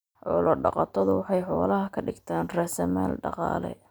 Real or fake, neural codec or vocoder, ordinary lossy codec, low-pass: real; none; none; none